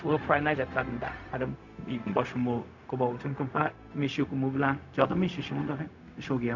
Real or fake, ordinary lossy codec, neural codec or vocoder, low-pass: fake; none; codec, 16 kHz, 0.4 kbps, LongCat-Audio-Codec; 7.2 kHz